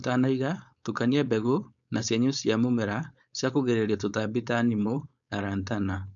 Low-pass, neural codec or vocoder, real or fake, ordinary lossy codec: 7.2 kHz; codec, 16 kHz, 4.8 kbps, FACodec; fake; none